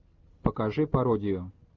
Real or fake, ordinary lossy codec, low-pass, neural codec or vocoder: real; Opus, 32 kbps; 7.2 kHz; none